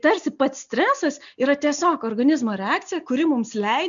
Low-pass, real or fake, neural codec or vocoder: 7.2 kHz; real; none